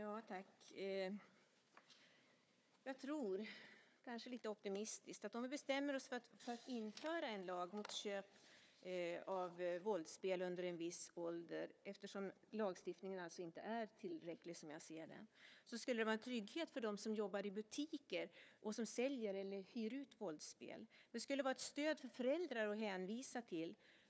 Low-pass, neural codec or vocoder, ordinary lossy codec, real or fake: none; codec, 16 kHz, 4 kbps, FunCodec, trained on Chinese and English, 50 frames a second; none; fake